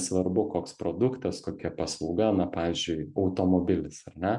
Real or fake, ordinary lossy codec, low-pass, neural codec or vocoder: real; MP3, 64 kbps; 10.8 kHz; none